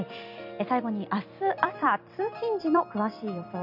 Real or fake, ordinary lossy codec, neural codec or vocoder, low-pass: fake; none; vocoder, 44.1 kHz, 128 mel bands every 256 samples, BigVGAN v2; 5.4 kHz